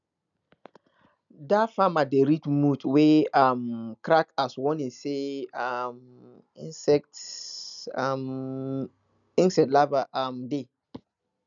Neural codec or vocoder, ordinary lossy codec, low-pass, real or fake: none; none; 7.2 kHz; real